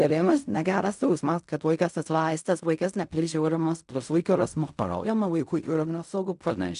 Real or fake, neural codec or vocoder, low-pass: fake; codec, 16 kHz in and 24 kHz out, 0.4 kbps, LongCat-Audio-Codec, fine tuned four codebook decoder; 10.8 kHz